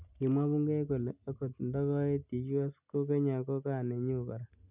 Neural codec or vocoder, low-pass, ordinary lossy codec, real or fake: none; 3.6 kHz; none; real